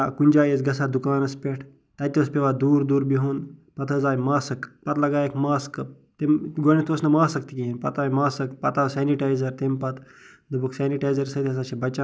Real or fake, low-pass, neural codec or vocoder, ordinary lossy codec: real; none; none; none